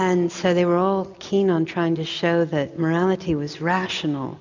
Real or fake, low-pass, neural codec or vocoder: real; 7.2 kHz; none